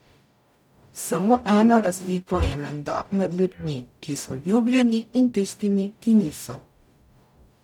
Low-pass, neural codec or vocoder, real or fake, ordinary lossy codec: 19.8 kHz; codec, 44.1 kHz, 0.9 kbps, DAC; fake; none